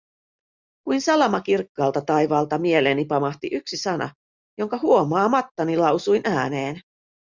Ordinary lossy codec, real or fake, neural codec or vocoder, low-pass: Opus, 64 kbps; real; none; 7.2 kHz